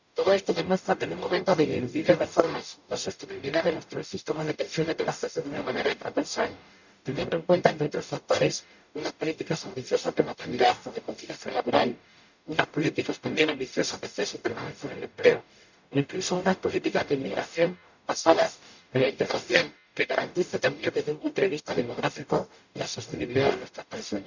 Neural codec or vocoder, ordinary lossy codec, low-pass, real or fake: codec, 44.1 kHz, 0.9 kbps, DAC; none; 7.2 kHz; fake